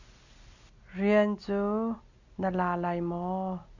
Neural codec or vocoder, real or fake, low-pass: none; real; 7.2 kHz